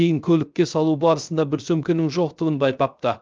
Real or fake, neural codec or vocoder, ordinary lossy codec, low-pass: fake; codec, 16 kHz, 0.3 kbps, FocalCodec; Opus, 24 kbps; 7.2 kHz